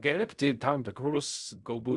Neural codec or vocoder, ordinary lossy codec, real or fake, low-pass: codec, 16 kHz in and 24 kHz out, 0.4 kbps, LongCat-Audio-Codec, fine tuned four codebook decoder; Opus, 64 kbps; fake; 10.8 kHz